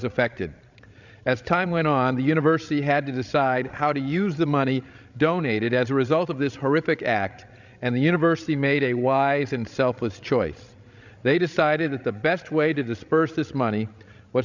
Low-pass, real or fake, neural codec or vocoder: 7.2 kHz; fake; codec, 16 kHz, 16 kbps, FreqCodec, larger model